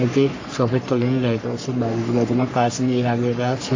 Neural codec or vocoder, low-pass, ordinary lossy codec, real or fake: codec, 44.1 kHz, 3.4 kbps, Pupu-Codec; 7.2 kHz; AAC, 48 kbps; fake